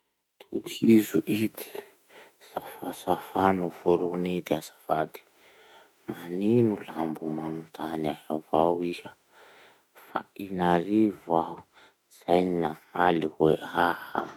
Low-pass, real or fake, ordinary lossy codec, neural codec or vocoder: 19.8 kHz; fake; MP3, 96 kbps; autoencoder, 48 kHz, 32 numbers a frame, DAC-VAE, trained on Japanese speech